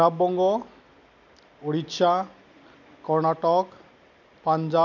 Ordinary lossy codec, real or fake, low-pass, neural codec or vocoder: none; real; 7.2 kHz; none